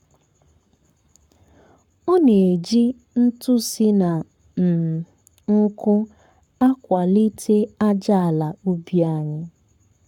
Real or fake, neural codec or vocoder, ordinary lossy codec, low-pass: fake; codec, 44.1 kHz, 7.8 kbps, Pupu-Codec; none; 19.8 kHz